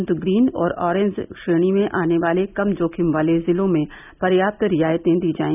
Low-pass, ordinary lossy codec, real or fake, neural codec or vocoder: 3.6 kHz; none; real; none